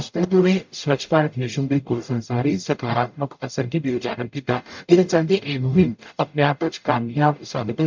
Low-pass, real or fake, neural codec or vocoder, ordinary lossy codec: 7.2 kHz; fake; codec, 44.1 kHz, 0.9 kbps, DAC; MP3, 64 kbps